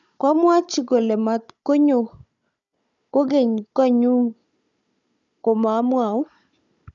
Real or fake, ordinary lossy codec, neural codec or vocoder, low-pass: fake; none; codec, 16 kHz, 16 kbps, FunCodec, trained on Chinese and English, 50 frames a second; 7.2 kHz